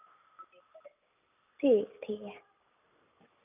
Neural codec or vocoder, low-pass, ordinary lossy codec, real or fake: none; 3.6 kHz; none; real